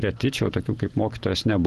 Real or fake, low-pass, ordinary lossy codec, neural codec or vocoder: real; 10.8 kHz; Opus, 16 kbps; none